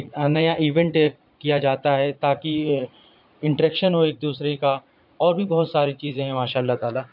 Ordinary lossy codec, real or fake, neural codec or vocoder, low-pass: none; fake; vocoder, 44.1 kHz, 80 mel bands, Vocos; 5.4 kHz